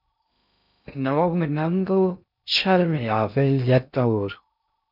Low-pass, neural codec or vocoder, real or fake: 5.4 kHz; codec, 16 kHz in and 24 kHz out, 0.6 kbps, FocalCodec, streaming, 2048 codes; fake